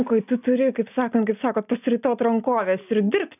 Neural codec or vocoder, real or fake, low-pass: none; real; 3.6 kHz